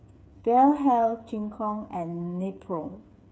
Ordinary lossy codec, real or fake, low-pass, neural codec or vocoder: none; fake; none; codec, 16 kHz, 8 kbps, FreqCodec, smaller model